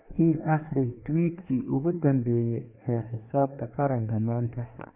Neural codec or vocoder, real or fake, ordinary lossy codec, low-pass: codec, 24 kHz, 1 kbps, SNAC; fake; none; 3.6 kHz